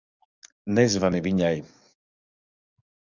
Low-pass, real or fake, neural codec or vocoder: 7.2 kHz; fake; codec, 44.1 kHz, 7.8 kbps, DAC